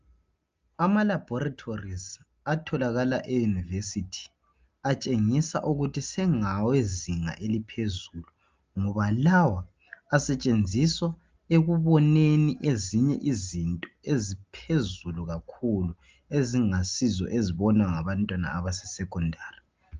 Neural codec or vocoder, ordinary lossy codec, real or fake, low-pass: none; Opus, 24 kbps; real; 7.2 kHz